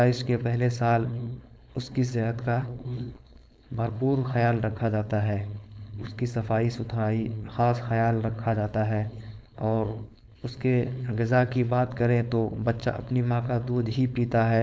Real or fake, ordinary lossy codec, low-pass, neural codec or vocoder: fake; none; none; codec, 16 kHz, 4.8 kbps, FACodec